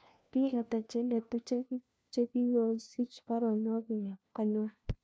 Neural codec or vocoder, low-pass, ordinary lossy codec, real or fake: codec, 16 kHz, 1 kbps, FunCodec, trained on LibriTTS, 50 frames a second; none; none; fake